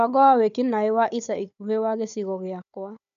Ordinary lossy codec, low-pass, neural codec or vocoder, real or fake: none; 7.2 kHz; codec, 16 kHz, 4 kbps, FunCodec, trained on Chinese and English, 50 frames a second; fake